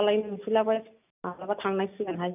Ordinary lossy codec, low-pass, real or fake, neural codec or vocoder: none; 3.6 kHz; real; none